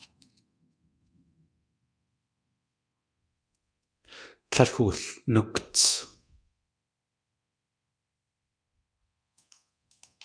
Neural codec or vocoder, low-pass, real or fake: codec, 24 kHz, 0.9 kbps, DualCodec; 9.9 kHz; fake